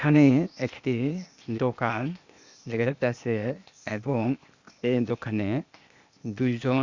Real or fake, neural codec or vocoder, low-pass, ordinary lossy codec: fake; codec, 16 kHz, 0.8 kbps, ZipCodec; 7.2 kHz; Opus, 64 kbps